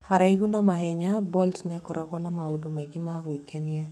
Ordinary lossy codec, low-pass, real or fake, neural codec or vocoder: none; 14.4 kHz; fake; codec, 32 kHz, 1.9 kbps, SNAC